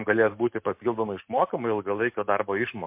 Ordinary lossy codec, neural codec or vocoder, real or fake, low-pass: MP3, 32 kbps; none; real; 3.6 kHz